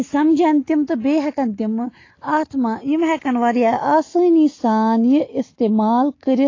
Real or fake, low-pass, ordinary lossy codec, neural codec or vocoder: real; 7.2 kHz; AAC, 32 kbps; none